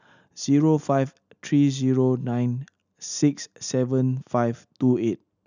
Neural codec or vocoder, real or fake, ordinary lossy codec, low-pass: none; real; none; 7.2 kHz